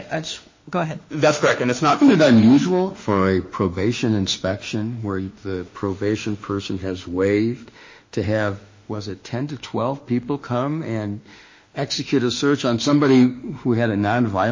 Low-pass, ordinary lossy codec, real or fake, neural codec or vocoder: 7.2 kHz; MP3, 32 kbps; fake; autoencoder, 48 kHz, 32 numbers a frame, DAC-VAE, trained on Japanese speech